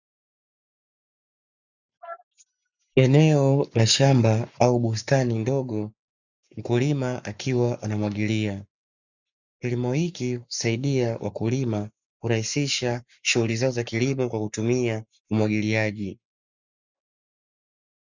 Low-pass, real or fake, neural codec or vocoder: 7.2 kHz; fake; codec, 44.1 kHz, 7.8 kbps, Pupu-Codec